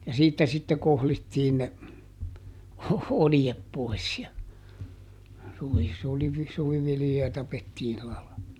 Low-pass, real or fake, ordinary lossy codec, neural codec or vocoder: 19.8 kHz; fake; none; vocoder, 44.1 kHz, 128 mel bands every 256 samples, BigVGAN v2